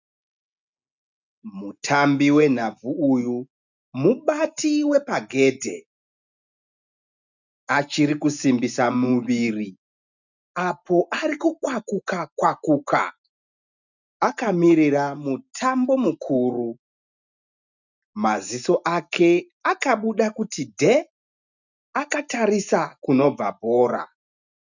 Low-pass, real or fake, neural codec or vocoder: 7.2 kHz; real; none